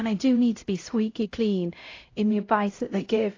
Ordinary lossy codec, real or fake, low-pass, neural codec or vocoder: AAC, 32 kbps; fake; 7.2 kHz; codec, 16 kHz, 0.5 kbps, X-Codec, HuBERT features, trained on LibriSpeech